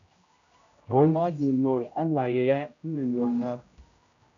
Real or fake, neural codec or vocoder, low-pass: fake; codec, 16 kHz, 0.5 kbps, X-Codec, HuBERT features, trained on general audio; 7.2 kHz